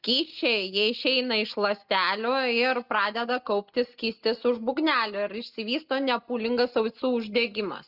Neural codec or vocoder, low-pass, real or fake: none; 5.4 kHz; real